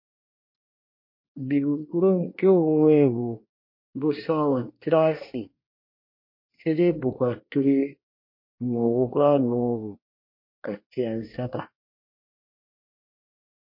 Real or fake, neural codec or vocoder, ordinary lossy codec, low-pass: fake; codec, 24 kHz, 1 kbps, SNAC; MP3, 32 kbps; 5.4 kHz